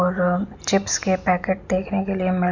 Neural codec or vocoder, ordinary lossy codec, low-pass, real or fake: none; none; 7.2 kHz; real